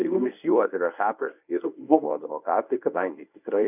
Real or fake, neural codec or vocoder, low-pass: fake; codec, 24 kHz, 0.9 kbps, WavTokenizer, medium speech release version 1; 3.6 kHz